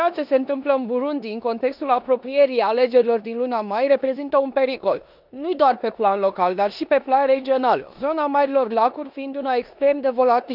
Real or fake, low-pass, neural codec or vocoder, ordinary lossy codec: fake; 5.4 kHz; codec, 16 kHz in and 24 kHz out, 0.9 kbps, LongCat-Audio-Codec, four codebook decoder; none